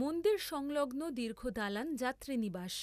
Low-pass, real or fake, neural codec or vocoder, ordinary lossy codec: 14.4 kHz; real; none; none